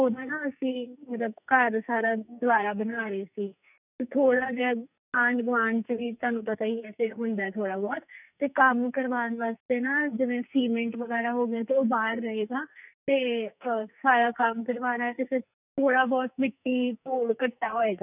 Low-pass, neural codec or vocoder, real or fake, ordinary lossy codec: 3.6 kHz; codec, 44.1 kHz, 2.6 kbps, SNAC; fake; none